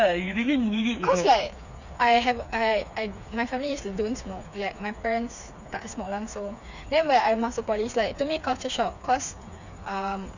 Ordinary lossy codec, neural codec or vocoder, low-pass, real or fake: none; codec, 16 kHz, 4 kbps, FreqCodec, smaller model; 7.2 kHz; fake